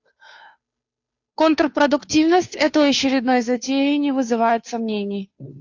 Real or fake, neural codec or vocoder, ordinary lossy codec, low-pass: fake; codec, 16 kHz, 2 kbps, FunCodec, trained on Chinese and English, 25 frames a second; AAC, 48 kbps; 7.2 kHz